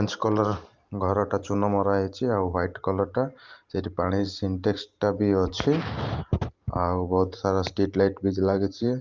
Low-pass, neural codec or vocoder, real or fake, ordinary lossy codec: 7.2 kHz; vocoder, 44.1 kHz, 128 mel bands every 512 samples, BigVGAN v2; fake; Opus, 24 kbps